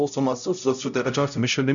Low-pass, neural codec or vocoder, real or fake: 7.2 kHz; codec, 16 kHz, 0.5 kbps, X-Codec, HuBERT features, trained on LibriSpeech; fake